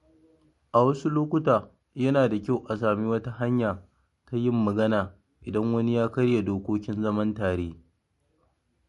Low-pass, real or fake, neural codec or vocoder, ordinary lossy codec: 14.4 kHz; real; none; MP3, 48 kbps